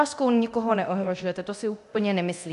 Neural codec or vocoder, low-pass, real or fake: codec, 24 kHz, 0.9 kbps, DualCodec; 10.8 kHz; fake